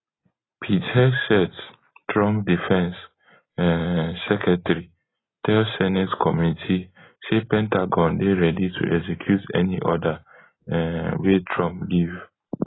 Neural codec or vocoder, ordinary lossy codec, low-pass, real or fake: none; AAC, 16 kbps; 7.2 kHz; real